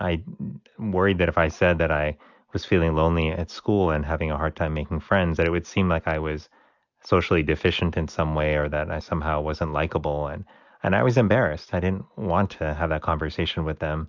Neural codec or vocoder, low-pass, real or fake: none; 7.2 kHz; real